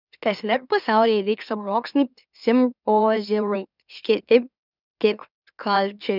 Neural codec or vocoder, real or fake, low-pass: autoencoder, 44.1 kHz, a latent of 192 numbers a frame, MeloTTS; fake; 5.4 kHz